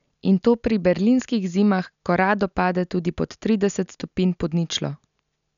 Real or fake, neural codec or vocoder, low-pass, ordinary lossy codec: real; none; 7.2 kHz; none